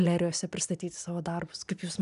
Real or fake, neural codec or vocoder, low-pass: real; none; 10.8 kHz